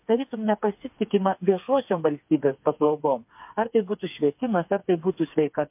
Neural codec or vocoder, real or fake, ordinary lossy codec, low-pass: codec, 16 kHz, 4 kbps, FreqCodec, smaller model; fake; MP3, 32 kbps; 3.6 kHz